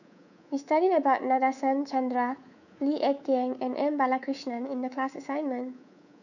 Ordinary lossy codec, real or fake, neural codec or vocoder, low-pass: none; fake; codec, 24 kHz, 3.1 kbps, DualCodec; 7.2 kHz